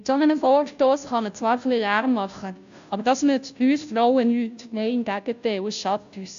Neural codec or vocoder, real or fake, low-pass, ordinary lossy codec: codec, 16 kHz, 0.5 kbps, FunCodec, trained on Chinese and English, 25 frames a second; fake; 7.2 kHz; AAC, 64 kbps